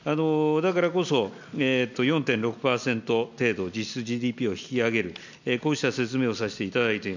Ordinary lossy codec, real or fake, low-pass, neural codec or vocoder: none; real; 7.2 kHz; none